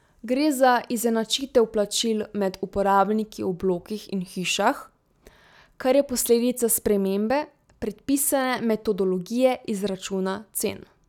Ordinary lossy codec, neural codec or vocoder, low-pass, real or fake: none; none; 19.8 kHz; real